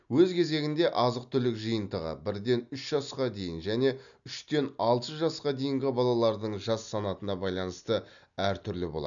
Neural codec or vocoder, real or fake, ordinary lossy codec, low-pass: none; real; none; 7.2 kHz